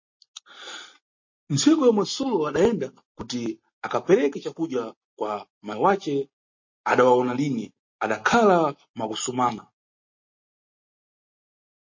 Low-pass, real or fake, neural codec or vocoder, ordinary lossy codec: 7.2 kHz; real; none; MP3, 32 kbps